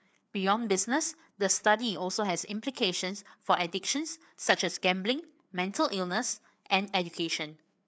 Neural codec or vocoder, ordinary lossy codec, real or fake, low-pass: codec, 16 kHz, 4 kbps, FreqCodec, larger model; none; fake; none